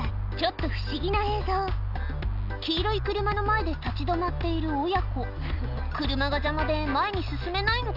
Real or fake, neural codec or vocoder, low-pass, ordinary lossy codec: real; none; 5.4 kHz; none